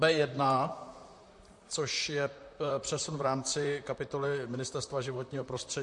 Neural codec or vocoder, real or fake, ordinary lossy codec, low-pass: vocoder, 44.1 kHz, 128 mel bands, Pupu-Vocoder; fake; MP3, 48 kbps; 10.8 kHz